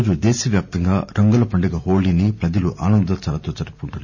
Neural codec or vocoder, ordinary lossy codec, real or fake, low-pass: none; none; real; 7.2 kHz